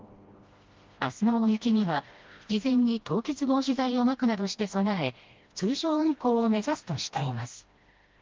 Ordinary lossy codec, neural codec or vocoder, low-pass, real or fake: Opus, 32 kbps; codec, 16 kHz, 1 kbps, FreqCodec, smaller model; 7.2 kHz; fake